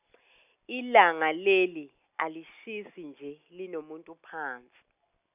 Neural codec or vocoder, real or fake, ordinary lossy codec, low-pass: none; real; none; 3.6 kHz